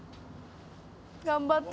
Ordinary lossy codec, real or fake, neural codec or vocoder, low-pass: none; real; none; none